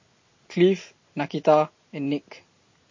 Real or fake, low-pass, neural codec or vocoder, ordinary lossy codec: real; 7.2 kHz; none; MP3, 32 kbps